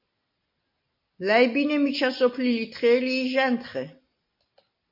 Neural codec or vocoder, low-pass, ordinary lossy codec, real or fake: none; 5.4 kHz; MP3, 32 kbps; real